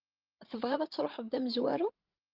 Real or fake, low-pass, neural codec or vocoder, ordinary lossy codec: fake; 5.4 kHz; codec, 16 kHz, 16 kbps, FreqCodec, larger model; Opus, 24 kbps